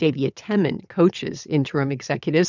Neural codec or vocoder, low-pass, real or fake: vocoder, 22.05 kHz, 80 mel bands, WaveNeXt; 7.2 kHz; fake